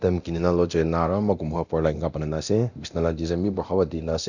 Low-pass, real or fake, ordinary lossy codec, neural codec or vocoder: 7.2 kHz; fake; none; codec, 24 kHz, 0.9 kbps, DualCodec